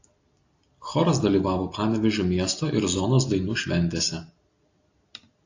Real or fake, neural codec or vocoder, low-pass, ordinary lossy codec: real; none; 7.2 kHz; AAC, 48 kbps